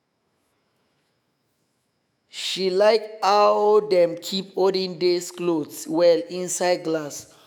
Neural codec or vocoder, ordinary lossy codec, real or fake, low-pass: autoencoder, 48 kHz, 128 numbers a frame, DAC-VAE, trained on Japanese speech; none; fake; none